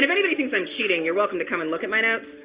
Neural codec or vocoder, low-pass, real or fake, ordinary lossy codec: none; 3.6 kHz; real; Opus, 64 kbps